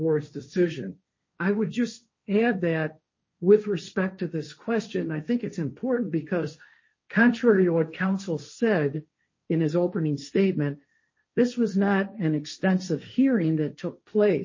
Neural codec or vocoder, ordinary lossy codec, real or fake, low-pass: codec, 16 kHz, 1.1 kbps, Voila-Tokenizer; MP3, 32 kbps; fake; 7.2 kHz